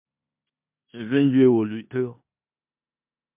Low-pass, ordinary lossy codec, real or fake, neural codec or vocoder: 3.6 kHz; MP3, 32 kbps; fake; codec, 16 kHz in and 24 kHz out, 0.9 kbps, LongCat-Audio-Codec, four codebook decoder